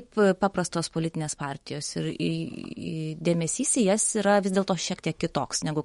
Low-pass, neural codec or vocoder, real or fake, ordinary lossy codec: 19.8 kHz; autoencoder, 48 kHz, 128 numbers a frame, DAC-VAE, trained on Japanese speech; fake; MP3, 48 kbps